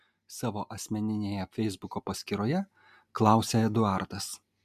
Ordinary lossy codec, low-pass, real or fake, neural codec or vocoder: MP3, 96 kbps; 14.4 kHz; real; none